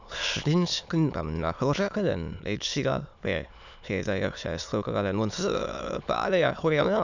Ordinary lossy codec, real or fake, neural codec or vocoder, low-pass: none; fake; autoencoder, 22.05 kHz, a latent of 192 numbers a frame, VITS, trained on many speakers; 7.2 kHz